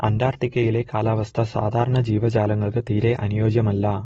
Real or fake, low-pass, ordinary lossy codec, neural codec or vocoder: real; 19.8 kHz; AAC, 24 kbps; none